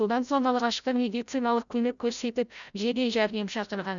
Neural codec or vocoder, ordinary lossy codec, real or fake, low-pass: codec, 16 kHz, 0.5 kbps, FreqCodec, larger model; none; fake; 7.2 kHz